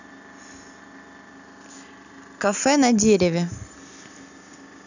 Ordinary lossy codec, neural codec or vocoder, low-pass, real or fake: none; none; 7.2 kHz; real